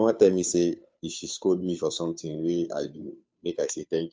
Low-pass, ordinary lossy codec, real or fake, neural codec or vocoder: none; none; fake; codec, 16 kHz, 2 kbps, FunCodec, trained on Chinese and English, 25 frames a second